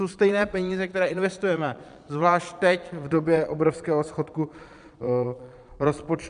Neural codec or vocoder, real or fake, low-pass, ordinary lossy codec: vocoder, 22.05 kHz, 80 mel bands, Vocos; fake; 9.9 kHz; MP3, 96 kbps